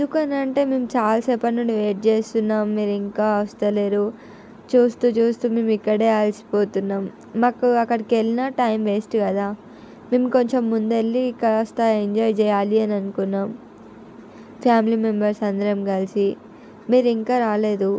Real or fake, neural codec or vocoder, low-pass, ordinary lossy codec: real; none; none; none